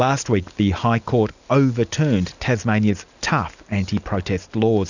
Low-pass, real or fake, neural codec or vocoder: 7.2 kHz; real; none